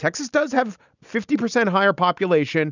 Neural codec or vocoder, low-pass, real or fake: none; 7.2 kHz; real